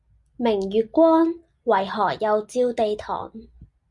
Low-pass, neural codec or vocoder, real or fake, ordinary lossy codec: 10.8 kHz; none; real; Opus, 64 kbps